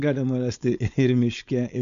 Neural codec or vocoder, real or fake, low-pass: codec, 16 kHz, 4.8 kbps, FACodec; fake; 7.2 kHz